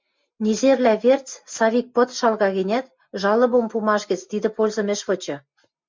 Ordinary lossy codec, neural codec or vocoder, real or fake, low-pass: MP3, 64 kbps; none; real; 7.2 kHz